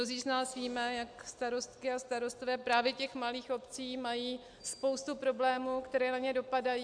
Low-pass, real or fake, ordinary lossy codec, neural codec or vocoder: 9.9 kHz; real; AAC, 64 kbps; none